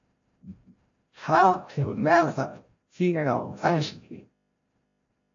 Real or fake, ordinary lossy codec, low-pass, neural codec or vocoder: fake; AAC, 48 kbps; 7.2 kHz; codec, 16 kHz, 0.5 kbps, FreqCodec, larger model